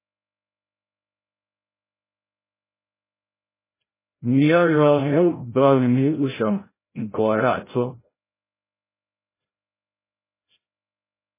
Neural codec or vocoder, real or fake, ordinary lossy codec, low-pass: codec, 16 kHz, 0.5 kbps, FreqCodec, larger model; fake; MP3, 16 kbps; 3.6 kHz